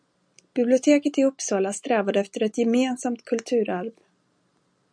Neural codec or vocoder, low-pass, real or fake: none; 9.9 kHz; real